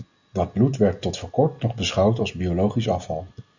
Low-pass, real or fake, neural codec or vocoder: 7.2 kHz; real; none